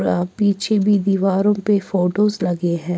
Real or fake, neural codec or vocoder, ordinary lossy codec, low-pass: real; none; none; none